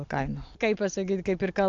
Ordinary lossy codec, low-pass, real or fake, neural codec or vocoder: AAC, 64 kbps; 7.2 kHz; real; none